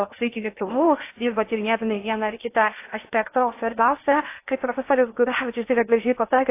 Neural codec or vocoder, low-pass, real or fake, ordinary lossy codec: codec, 16 kHz in and 24 kHz out, 0.6 kbps, FocalCodec, streaming, 2048 codes; 3.6 kHz; fake; AAC, 24 kbps